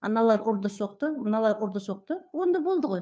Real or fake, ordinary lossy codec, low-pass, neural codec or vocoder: fake; none; none; codec, 16 kHz, 2 kbps, FunCodec, trained on Chinese and English, 25 frames a second